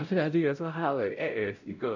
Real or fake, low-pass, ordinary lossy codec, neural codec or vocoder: fake; 7.2 kHz; none; codec, 16 kHz, 0.5 kbps, X-Codec, WavLM features, trained on Multilingual LibriSpeech